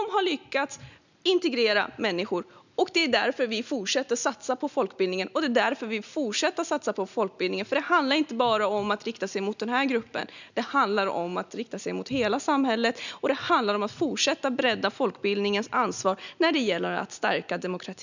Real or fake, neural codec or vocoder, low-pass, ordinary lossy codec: real; none; 7.2 kHz; none